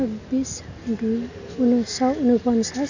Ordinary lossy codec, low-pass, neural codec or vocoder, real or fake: none; 7.2 kHz; none; real